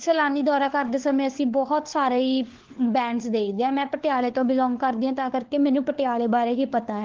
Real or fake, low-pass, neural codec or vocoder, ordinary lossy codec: fake; 7.2 kHz; codec, 16 kHz, 4 kbps, FunCodec, trained on LibriTTS, 50 frames a second; Opus, 16 kbps